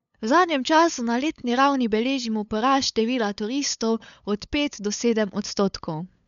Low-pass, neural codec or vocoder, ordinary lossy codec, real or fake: 7.2 kHz; codec, 16 kHz, 8 kbps, FunCodec, trained on LibriTTS, 25 frames a second; MP3, 96 kbps; fake